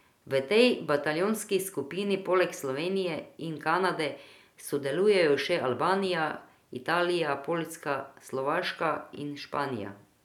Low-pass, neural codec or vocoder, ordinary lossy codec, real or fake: 19.8 kHz; none; none; real